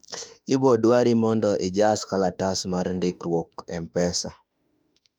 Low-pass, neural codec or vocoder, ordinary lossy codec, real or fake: 19.8 kHz; autoencoder, 48 kHz, 32 numbers a frame, DAC-VAE, trained on Japanese speech; none; fake